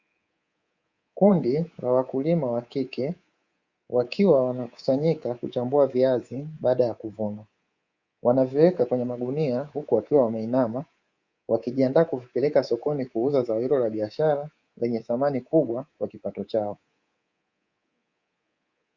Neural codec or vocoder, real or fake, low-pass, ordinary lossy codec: codec, 24 kHz, 3.1 kbps, DualCodec; fake; 7.2 kHz; Opus, 64 kbps